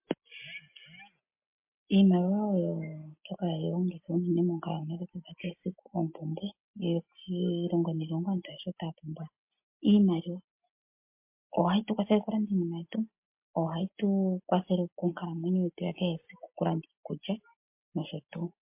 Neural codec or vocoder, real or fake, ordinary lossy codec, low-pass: none; real; MP3, 32 kbps; 3.6 kHz